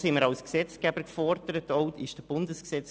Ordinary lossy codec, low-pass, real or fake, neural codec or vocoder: none; none; real; none